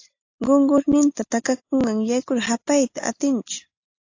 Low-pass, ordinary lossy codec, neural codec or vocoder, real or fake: 7.2 kHz; AAC, 48 kbps; none; real